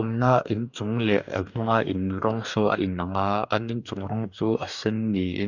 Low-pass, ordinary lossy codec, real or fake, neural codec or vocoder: 7.2 kHz; none; fake; codec, 44.1 kHz, 2.6 kbps, DAC